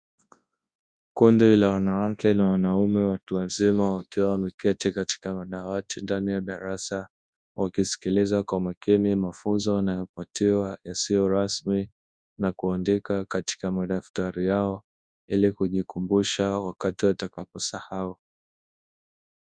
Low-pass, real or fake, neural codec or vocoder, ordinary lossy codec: 9.9 kHz; fake; codec, 24 kHz, 0.9 kbps, WavTokenizer, large speech release; Opus, 64 kbps